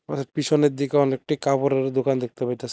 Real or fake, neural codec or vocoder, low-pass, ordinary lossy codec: real; none; none; none